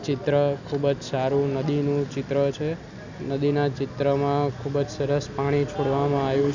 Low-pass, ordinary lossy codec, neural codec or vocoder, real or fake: 7.2 kHz; none; none; real